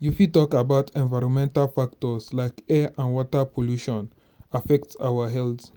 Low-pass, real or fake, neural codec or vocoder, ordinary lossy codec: none; real; none; none